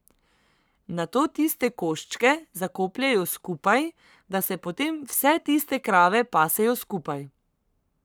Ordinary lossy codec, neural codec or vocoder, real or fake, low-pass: none; codec, 44.1 kHz, 7.8 kbps, Pupu-Codec; fake; none